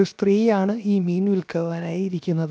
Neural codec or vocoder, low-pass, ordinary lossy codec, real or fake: codec, 16 kHz, 0.7 kbps, FocalCodec; none; none; fake